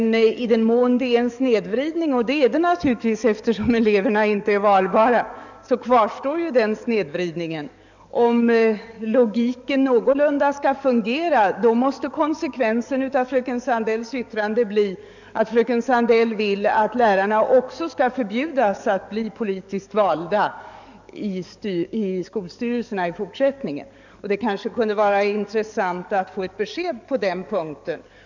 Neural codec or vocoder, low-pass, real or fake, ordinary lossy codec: codec, 44.1 kHz, 7.8 kbps, DAC; 7.2 kHz; fake; none